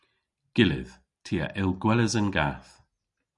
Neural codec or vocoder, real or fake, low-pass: none; real; 10.8 kHz